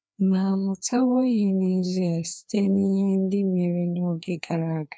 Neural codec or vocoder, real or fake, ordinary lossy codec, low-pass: codec, 16 kHz, 2 kbps, FreqCodec, larger model; fake; none; none